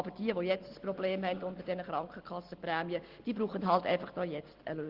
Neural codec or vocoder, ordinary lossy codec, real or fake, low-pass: none; Opus, 16 kbps; real; 5.4 kHz